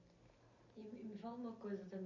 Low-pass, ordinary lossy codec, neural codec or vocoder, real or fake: 7.2 kHz; none; none; real